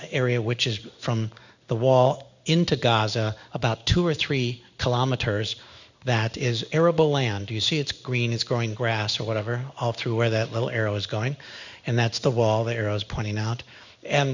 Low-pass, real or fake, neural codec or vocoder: 7.2 kHz; fake; codec, 16 kHz in and 24 kHz out, 1 kbps, XY-Tokenizer